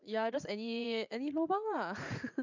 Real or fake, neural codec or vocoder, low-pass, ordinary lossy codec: fake; vocoder, 44.1 kHz, 80 mel bands, Vocos; 7.2 kHz; none